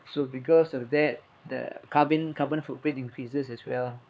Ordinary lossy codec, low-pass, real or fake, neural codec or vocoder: none; none; fake; codec, 16 kHz, 4 kbps, X-Codec, HuBERT features, trained on LibriSpeech